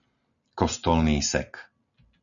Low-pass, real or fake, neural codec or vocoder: 7.2 kHz; real; none